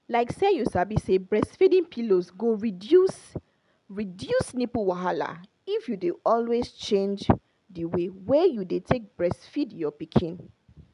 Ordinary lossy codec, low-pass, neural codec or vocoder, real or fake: none; 10.8 kHz; none; real